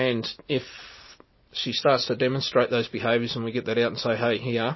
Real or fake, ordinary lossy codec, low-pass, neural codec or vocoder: real; MP3, 24 kbps; 7.2 kHz; none